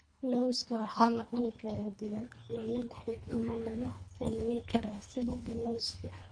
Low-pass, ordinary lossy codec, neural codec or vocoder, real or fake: 9.9 kHz; MP3, 48 kbps; codec, 24 kHz, 1.5 kbps, HILCodec; fake